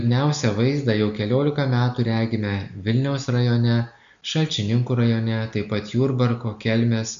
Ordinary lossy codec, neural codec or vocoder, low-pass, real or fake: MP3, 64 kbps; none; 7.2 kHz; real